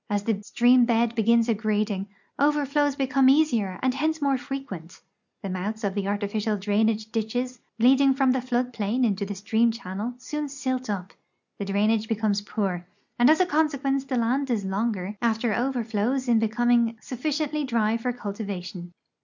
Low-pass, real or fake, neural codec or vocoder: 7.2 kHz; real; none